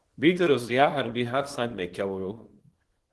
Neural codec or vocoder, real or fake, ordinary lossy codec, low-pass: codec, 24 kHz, 0.9 kbps, WavTokenizer, small release; fake; Opus, 16 kbps; 10.8 kHz